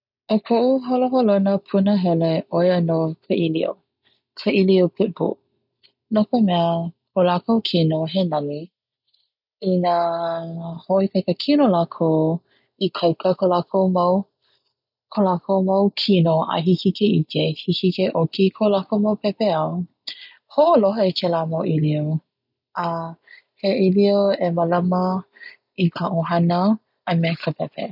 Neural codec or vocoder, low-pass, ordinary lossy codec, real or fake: none; 5.4 kHz; none; real